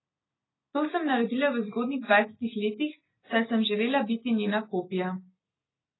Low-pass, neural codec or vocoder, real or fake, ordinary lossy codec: 7.2 kHz; none; real; AAC, 16 kbps